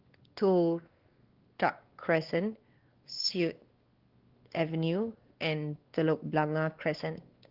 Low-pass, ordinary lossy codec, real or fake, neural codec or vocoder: 5.4 kHz; Opus, 16 kbps; fake; codec, 16 kHz, 2 kbps, X-Codec, WavLM features, trained on Multilingual LibriSpeech